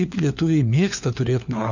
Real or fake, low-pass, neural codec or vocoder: fake; 7.2 kHz; codec, 16 kHz, 4 kbps, FunCodec, trained on LibriTTS, 50 frames a second